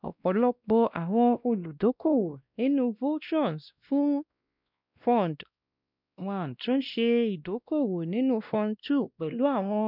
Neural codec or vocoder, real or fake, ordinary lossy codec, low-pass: codec, 16 kHz, 1 kbps, X-Codec, WavLM features, trained on Multilingual LibriSpeech; fake; none; 5.4 kHz